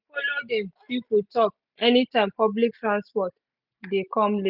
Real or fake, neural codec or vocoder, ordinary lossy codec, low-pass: real; none; none; 5.4 kHz